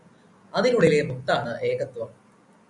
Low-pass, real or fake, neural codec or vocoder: 10.8 kHz; real; none